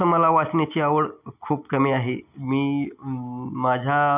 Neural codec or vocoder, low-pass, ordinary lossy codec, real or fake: none; 3.6 kHz; none; real